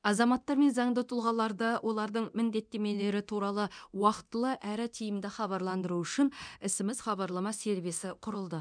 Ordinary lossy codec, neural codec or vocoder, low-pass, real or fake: none; codec, 24 kHz, 0.9 kbps, DualCodec; 9.9 kHz; fake